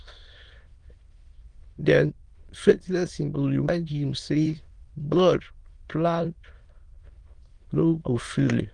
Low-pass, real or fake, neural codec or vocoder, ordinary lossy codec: 9.9 kHz; fake; autoencoder, 22.05 kHz, a latent of 192 numbers a frame, VITS, trained on many speakers; Opus, 24 kbps